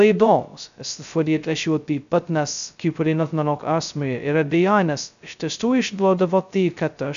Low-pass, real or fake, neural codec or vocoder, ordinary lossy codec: 7.2 kHz; fake; codec, 16 kHz, 0.2 kbps, FocalCodec; MP3, 96 kbps